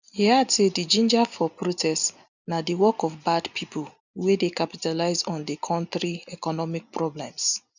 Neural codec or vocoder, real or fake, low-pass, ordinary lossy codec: none; real; 7.2 kHz; none